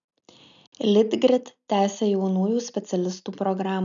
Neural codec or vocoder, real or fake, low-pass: none; real; 7.2 kHz